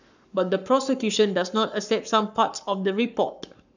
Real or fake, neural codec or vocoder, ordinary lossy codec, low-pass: fake; codec, 44.1 kHz, 7.8 kbps, Pupu-Codec; none; 7.2 kHz